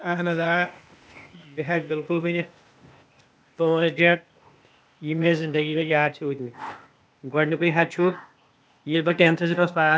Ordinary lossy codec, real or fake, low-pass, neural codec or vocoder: none; fake; none; codec, 16 kHz, 0.8 kbps, ZipCodec